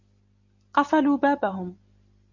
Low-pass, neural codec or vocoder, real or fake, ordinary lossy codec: 7.2 kHz; none; real; MP3, 48 kbps